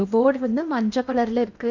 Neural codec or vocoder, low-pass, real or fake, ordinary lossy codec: codec, 16 kHz in and 24 kHz out, 0.8 kbps, FocalCodec, streaming, 65536 codes; 7.2 kHz; fake; none